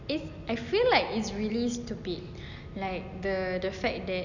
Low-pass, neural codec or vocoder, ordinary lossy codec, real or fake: 7.2 kHz; none; none; real